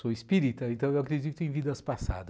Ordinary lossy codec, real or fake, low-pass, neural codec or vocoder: none; real; none; none